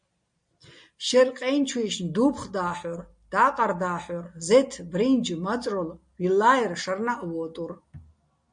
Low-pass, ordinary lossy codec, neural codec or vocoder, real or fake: 9.9 kHz; MP3, 48 kbps; none; real